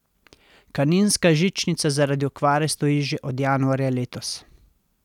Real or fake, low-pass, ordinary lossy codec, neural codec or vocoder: real; 19.8 kHz; none; none